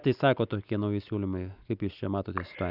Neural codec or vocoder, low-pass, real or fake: none; 5.4 kHz; real